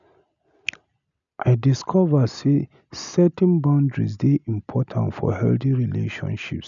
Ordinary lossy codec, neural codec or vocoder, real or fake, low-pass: none; none; real; 7.2 kHz